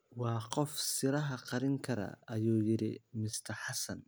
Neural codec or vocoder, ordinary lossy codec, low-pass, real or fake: none; none; none; real